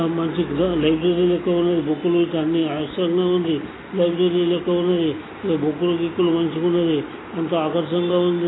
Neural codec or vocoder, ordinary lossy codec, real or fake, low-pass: none; AAC, 16 kbps; real; 7.2 kHz